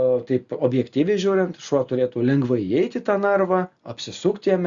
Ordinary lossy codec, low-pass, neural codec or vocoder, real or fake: Opus, 64 kbps; 7.2 kHz; none; real